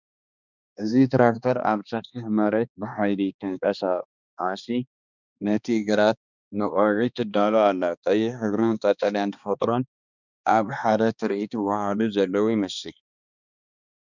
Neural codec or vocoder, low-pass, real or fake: codec, 16 kHz, 2 kbps, X-Codec, HuBERT features, trained on balanced general audio; 7.2 kHz; fake